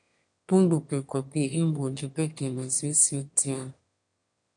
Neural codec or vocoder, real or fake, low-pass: autoencoder, 22.05 kHz, a latent of 192 numbers a frame, VITS, trained on one speaker; fake; 9.9 kHz